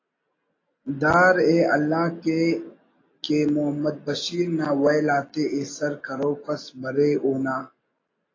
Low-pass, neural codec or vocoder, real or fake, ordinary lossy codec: 7.2 kHz; none; real; AAC, 32 kbps